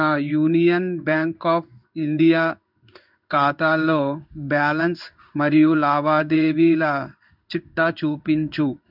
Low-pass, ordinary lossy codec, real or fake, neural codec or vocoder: 5.4 kHz; none; fake; codec, 16 kHz in and 24 kHz out, 1 kbps, XY-Tokenizer